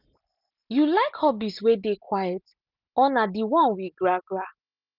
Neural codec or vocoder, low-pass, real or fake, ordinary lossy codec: none; 5.4 kHz; real; none